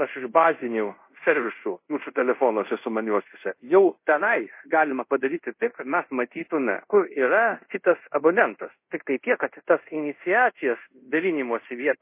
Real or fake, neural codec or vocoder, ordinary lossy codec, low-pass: fake; codec, 24 kHz, 0.5 kbps, DualCodec; MP3, 24 kbps; 3.6 kHz